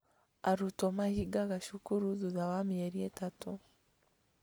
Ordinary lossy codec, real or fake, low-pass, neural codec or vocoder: none; real; none; none